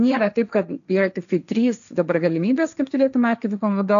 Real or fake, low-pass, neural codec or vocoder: fake; 7.2 kHz; codec, 16 kHz, 1.1 kbps, Voila-Tokenizer